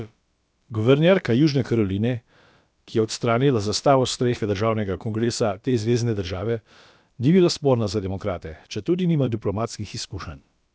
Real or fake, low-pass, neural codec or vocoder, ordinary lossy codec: fake; none; codec, 16 kHz, about 1 kbps, DyCAST, with the encoder's durations; none